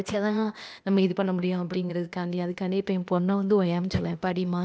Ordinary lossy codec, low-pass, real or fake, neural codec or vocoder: none; none; fake; codec, 16 kHz, 0.8 kbps, ZipCodec